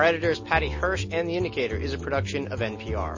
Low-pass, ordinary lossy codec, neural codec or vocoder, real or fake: 7.2 kHz; MP3, 32 kbps; none; real